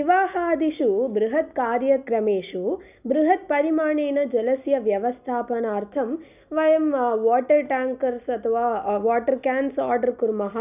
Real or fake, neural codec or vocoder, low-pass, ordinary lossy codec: real; none; 3.6 kHz; none